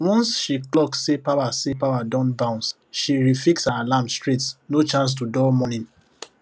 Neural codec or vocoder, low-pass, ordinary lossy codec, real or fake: none; none; none; real